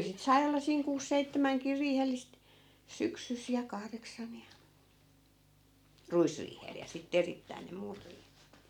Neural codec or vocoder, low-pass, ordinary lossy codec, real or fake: none; 19.8 kHz; none; real